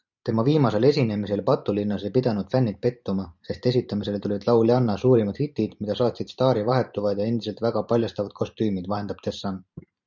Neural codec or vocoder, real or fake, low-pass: vocoder, 44.1 kHz, 128 mel bands every 512 samples, BigVGAN v2; fake; 7.2 kHz